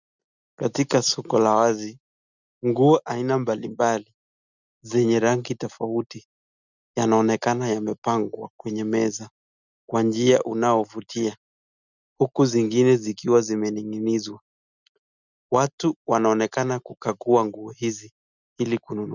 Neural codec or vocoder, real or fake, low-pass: none; real; 7.2 kHz